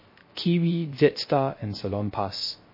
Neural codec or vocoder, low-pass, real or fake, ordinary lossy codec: codec, 16 kHz, 0.3 kbps, FocalCodec; 5.4 kHz; fake; MP3, 24 kbps